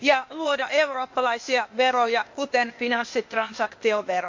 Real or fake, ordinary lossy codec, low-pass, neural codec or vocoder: fake; MP3, 48 kbps; 7.2 kHz; codec, 16 kHz, 0.8 kbps, ZipCodec